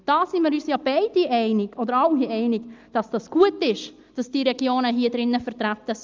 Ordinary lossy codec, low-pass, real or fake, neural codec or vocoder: Opus, 24 kbps; 7.2 kHz; real; none